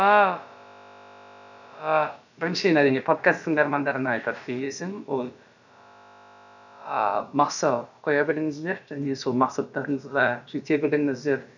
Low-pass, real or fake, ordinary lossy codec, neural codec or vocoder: 7.2 kHz; fake; none; codec, 16 kHz, about 1 kbps, DyCAST, with the encoder's durations